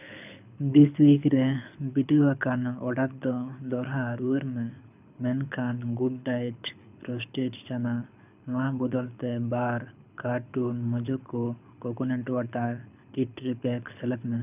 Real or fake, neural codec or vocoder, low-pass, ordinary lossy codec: fake; codec, 24 kHz, 6 kbps, HILCodec; 3.6 kHz; none